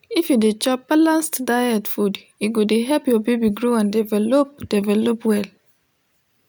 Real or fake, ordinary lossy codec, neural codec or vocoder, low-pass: real; none; none; none